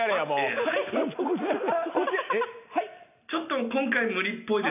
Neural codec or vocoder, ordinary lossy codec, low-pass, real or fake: none; none; 3.6 kHz; real